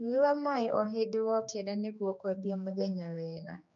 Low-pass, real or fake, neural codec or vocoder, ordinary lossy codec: 7.2 kHz; fake; codec, 16 kHz, 2 kbps, X-Codec, HuBERT features, trained on general audio; none